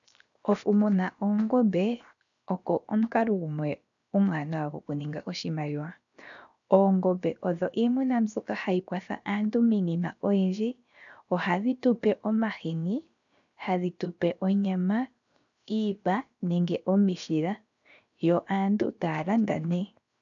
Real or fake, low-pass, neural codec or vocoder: fake; 7.2 kHz; codec, 16 kHz, 0.7 kbps, FocalCodec